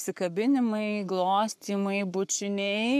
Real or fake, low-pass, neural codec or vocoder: fake; 14.4 kHz; codec, 44.1 kHz, 7.8 kbps, Pupu-Codec